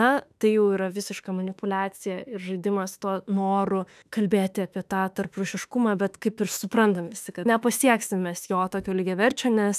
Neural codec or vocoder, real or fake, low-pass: autoencoder, 48 kHz, 32 numbers a frame, DAC-VAE, trained on Japanese speech; fake; 14.4 kHz